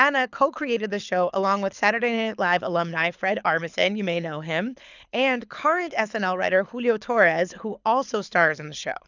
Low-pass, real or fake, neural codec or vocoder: 7.2 kHz; fake; codec, 24 kHz, 6 kbps, HILCodec